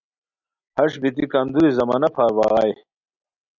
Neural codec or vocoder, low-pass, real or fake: none; 7.2 kHz; real